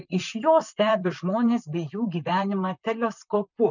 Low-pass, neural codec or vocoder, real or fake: 7.2 kHz; vocoder, 44.1 kHz, 128 mel bands, Pupu-Vocoder; fake